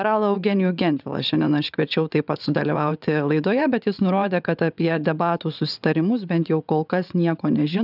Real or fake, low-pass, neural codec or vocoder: fake; 5.4 kHz; vocoder, 22.05 kHz, 80 mel bands, WaveNeXt